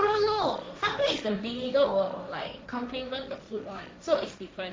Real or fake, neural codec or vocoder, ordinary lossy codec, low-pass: fake; codec, 16 kHz, 1.1 kbps, Voila-Tokenizer; none; none